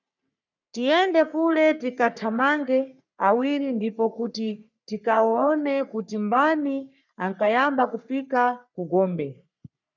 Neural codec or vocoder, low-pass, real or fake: codec, 44.1 kHz, 3.4 kbps, Pupu-Codec; 7.2 kHz; fake